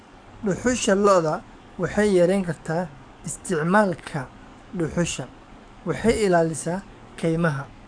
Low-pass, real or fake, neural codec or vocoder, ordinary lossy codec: 9.9 kHz; fake; codec, 44.1 kHz, 7.8 kbps, DAC; none